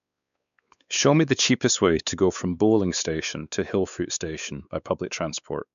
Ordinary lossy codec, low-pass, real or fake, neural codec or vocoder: none; 7.2 kHz; fake; codec, 16 kHz, 4 kbps, X-Codec, WavLM features, trained on Multilingual LibriSpeech